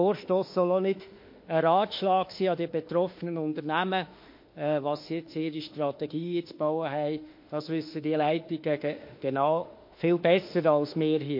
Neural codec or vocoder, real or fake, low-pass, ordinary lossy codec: autoencoder, 48 kHz, 32 numbers a frame, DAC-VAE, trained on Japanese speech; fake; 5.4 kHz; MP3, 32 kbps